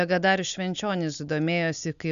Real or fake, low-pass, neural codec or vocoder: real; 7.2 kHz; none